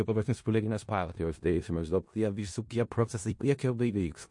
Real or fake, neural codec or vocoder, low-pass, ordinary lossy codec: fake; codec, 16 kHz in and 24 kHz out, 0.4 kbps, LongCat-Audio-Codec, four codebook decoder; 10.8 kHz; MP3, 48 kbps